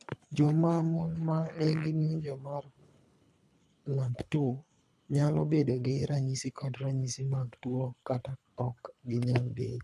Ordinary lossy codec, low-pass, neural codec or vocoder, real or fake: none; none; codec, 24 kHz, 3 kbps, HILCodec; fake